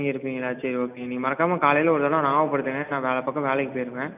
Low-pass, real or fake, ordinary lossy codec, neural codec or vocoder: 3.6 kHz; real; none; none